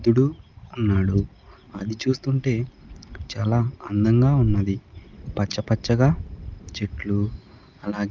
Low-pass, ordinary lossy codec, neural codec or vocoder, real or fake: 7.2 kHz; Opus, 32 kbps; none; real